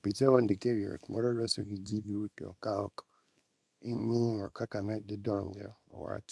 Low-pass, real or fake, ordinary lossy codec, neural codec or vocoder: none; fake; none; codec, 24 kHz, 0.9 kbps, WavTokenizer, small release